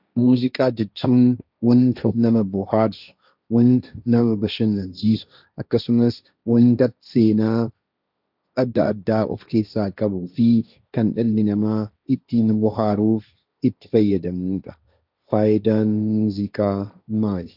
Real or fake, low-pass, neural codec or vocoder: fake; 5.4 kHz; codec, 16 kHz, 1.1 kbps, Voila-Tokenizer